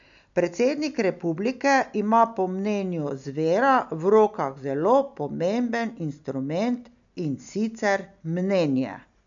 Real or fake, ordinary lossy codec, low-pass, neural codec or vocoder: real; none; 7.2 kHz; none